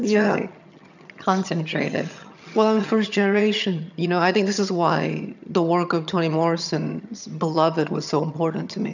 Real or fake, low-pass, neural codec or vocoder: fake; 7.2 kHz; vocoder, 22.05 kHz, 80 mel bands, HiFi-GAN